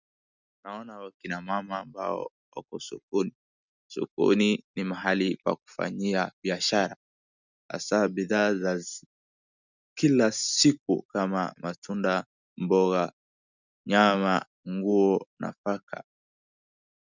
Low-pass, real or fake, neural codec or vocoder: 7.2 kHz; real; none